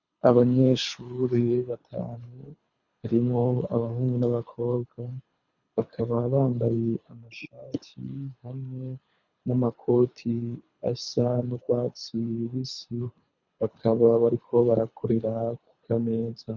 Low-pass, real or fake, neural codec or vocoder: 7.2 kHz; fake; codec, 24 kHz, 3 kbps, HILCodec